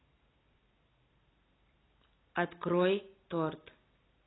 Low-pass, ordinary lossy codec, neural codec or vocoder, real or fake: 7.2 kHz; AAC, 16 kbps; none; real